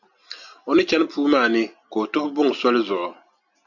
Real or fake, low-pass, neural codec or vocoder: real; 7.2 kHz; none